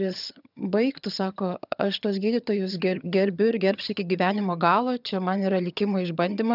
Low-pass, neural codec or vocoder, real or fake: 5.4 kHz; vocoder, 22.05 kHz, 80 mel bands, HiFi-GAN; fake